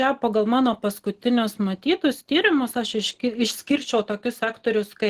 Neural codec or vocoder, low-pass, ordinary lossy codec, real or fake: none; 14.4 kHz; Opus, 16 kbps; real